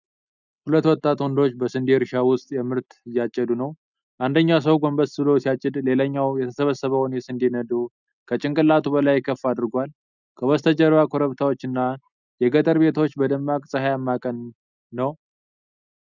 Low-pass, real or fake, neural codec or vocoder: 7.2 kHz; real; none